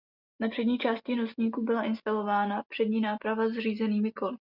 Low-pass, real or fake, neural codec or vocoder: 5.4 kHz; real; none